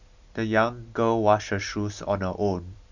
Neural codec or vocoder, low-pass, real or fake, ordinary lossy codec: none; 7.2 kHz; real; none